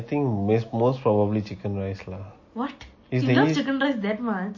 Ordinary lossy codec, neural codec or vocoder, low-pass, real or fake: MP3, 32 kbps; none; 7.2 kHz; real